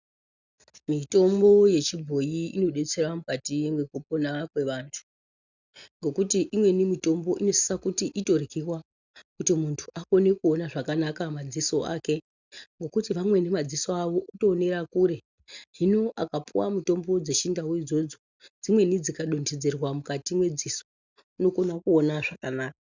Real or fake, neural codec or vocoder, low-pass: real; none; 7.2 kHz